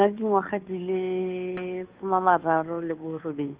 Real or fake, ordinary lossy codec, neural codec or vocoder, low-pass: fake; Opus, 24 kbps; codec, 16 kHz, 2 kbps, FunCodec, trained on Chinese and English, 25 frames a second; 3.6 kHz